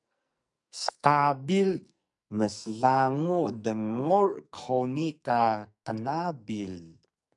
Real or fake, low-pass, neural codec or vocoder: fake; 10.8 kHz; codec, 44.1 kHz, 2.6 kbps, SNAC